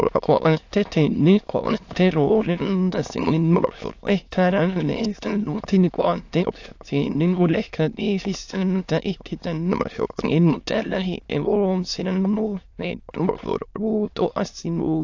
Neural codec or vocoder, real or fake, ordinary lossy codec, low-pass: autoencoder, 22.05 kHz, a latent of 192 numbers a frame, VITS, trained on many speakers; fake; AAC, 48 kbps; 7.2 kHz